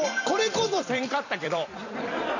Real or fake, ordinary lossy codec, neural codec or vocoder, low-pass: fake; none; vocoder, 44.1 kHz, 128 mel bands every 256 samples, BigVGAN v2; 7.2 kHz